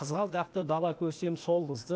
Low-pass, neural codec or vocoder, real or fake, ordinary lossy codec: none; codec, 16 kHz, 0.8 kbps, ZipCodec; fake; none